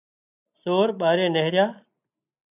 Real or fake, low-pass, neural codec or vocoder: real; 3.6 kHz; none